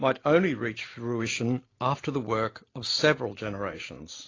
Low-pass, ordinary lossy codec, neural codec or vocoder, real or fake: 7.2 kHz; AAC, 32 kbps; none; real